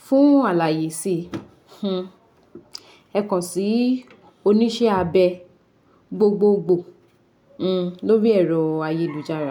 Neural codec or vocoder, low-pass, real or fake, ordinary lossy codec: none; 19.8 kHz; real; none